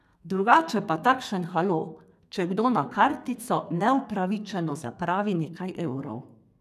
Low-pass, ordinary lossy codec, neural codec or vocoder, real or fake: 14.4 kHz; none; codec, 32 kHz, 1.9 kbps, SNAC; fake